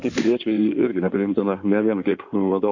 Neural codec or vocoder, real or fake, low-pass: codec, 16 kHz in and 24 kHz out, 2.2 kbps, FireRedTTS-2 codec; fake; 7.2 kHz